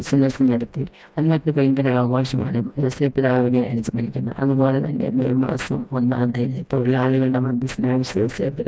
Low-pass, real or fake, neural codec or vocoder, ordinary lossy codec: none; fake; codec, 16 kHz, 1 kbps, FreqCodec, smaller model; none